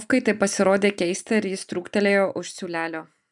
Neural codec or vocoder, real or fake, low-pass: none; real; 10.8 kHz